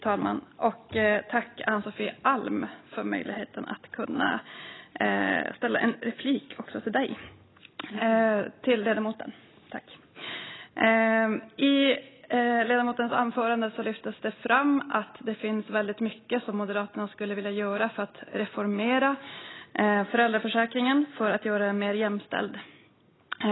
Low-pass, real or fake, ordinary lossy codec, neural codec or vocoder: 7.2 kHz; real; AAC, 16 kbps; none